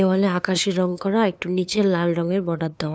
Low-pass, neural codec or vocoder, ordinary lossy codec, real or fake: none; codec, 16 kHz, 2 kbps, FunCodec, trained on LibriTTS, 25 frames a second; none; fake